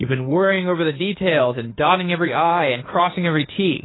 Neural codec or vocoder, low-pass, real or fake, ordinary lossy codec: codec, 16 kHz in and 24 kHz out, 2.2 kbps, FireRedTTS-2 codec; 7.2 kHz; fake; AAC, 16 kbps